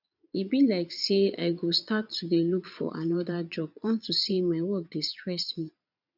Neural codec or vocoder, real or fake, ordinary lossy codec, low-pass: vocoder, 22.05 kHz, 80 mel bands, Vocos; fake; none; 5.4 kHz